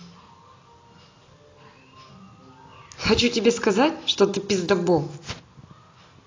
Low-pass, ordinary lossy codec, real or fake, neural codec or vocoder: 7.2 kHz; AAC, 32 kbps; real; none